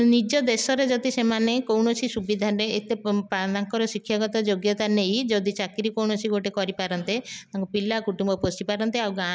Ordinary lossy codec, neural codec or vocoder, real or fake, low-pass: none; none; real; none